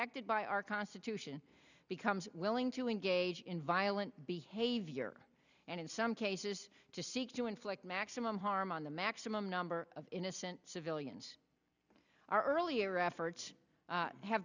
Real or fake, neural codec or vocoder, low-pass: real; none; 7.2 kHz